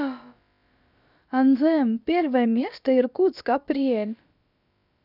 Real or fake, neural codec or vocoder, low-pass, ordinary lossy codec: fake; codec, 16 kHz, about 1 kbps, DyCAST, with the encoder's durations; 5.4 kHz; none